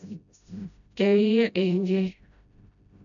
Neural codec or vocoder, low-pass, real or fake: codec, 16 kHz, 0.5 kbps, FreqCodec, smaller model; 7.2 kHz; fake